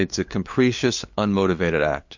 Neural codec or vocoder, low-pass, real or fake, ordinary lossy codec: codec, 16 kHz, 8 kbps, FunCodec, trained on LibriTTS, 25 frames a second; 7.2 kHz; fake; MP3, 48 kbps